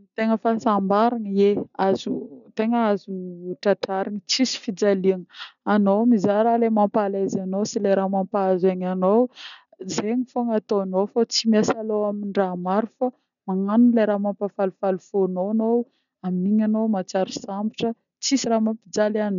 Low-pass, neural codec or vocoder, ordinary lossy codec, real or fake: 7.2 kHz; none; none; real